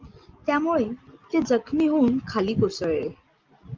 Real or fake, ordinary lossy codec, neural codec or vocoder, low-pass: real; Opus, 32 kbps; none; 7.2 kHz